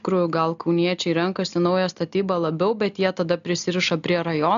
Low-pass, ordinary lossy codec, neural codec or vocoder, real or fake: 7.2 kHz; AAC, 96 kbps; none; real